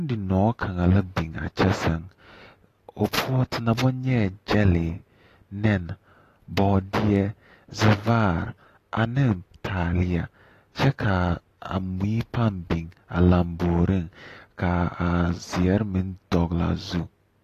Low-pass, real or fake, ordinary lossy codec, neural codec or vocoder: 14.4 kHz; real; AAC, 48 kbps; none